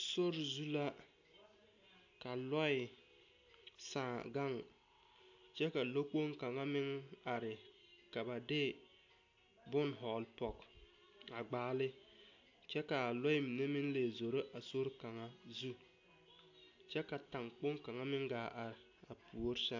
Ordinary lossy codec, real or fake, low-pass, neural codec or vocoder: AAC, 48 kbps; real; 7.2 kHz; none